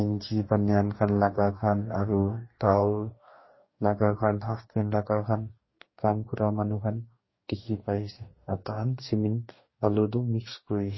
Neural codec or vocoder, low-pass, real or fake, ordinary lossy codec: codec, 44.1 kHz, 2.6 kbps, DAC; 7.2 kHz; fake; MP3, 24 kbps